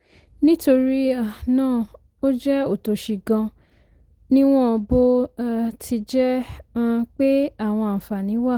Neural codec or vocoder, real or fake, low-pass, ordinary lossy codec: none; real; 19.8 kHz; Opus, 16 kbps